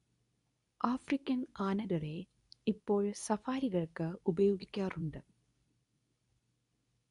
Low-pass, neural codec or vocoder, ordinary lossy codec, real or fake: 10.8 kHz; codec, 24 kHz, 0.9 kbps, WavTokenizer, medium speech release version 2; none; fake